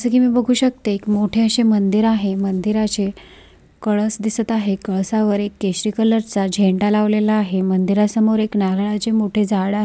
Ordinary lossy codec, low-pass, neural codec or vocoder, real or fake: none; none; none; real